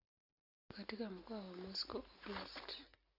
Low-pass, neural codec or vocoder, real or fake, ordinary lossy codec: 5.4 kHz; none; real; Opus, 64 kbps